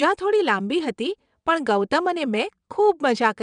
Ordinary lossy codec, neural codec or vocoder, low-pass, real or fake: none; vocoder, 22.05 kHz, 80 mel bands, WaveNeXt; 9.9 kHz; fake